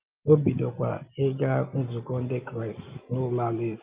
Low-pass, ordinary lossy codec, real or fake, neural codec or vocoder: 3.6 kHz; Opus, 32 kbps; real; none